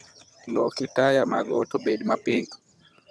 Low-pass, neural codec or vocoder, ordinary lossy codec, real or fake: none; vocoder, 22.05 kHz, 80 mel bands, HiFi-GAN; none; fake